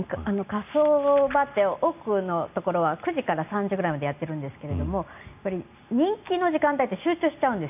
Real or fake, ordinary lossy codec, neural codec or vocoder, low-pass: real; none; none; 3.6 kHz